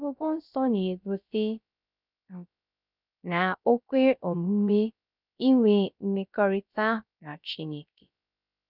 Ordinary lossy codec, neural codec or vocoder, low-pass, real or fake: none; codec, 16 kHz, 0.3 kbps, FocalCodec; 5.4 kHz; fake